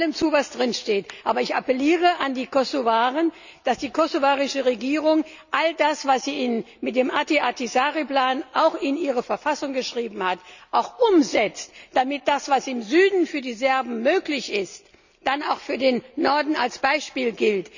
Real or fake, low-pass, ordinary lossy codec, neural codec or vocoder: real; 7.2 kHz; none; none